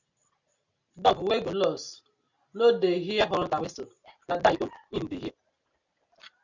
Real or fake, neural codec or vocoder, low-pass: real; none; 7.2 kHz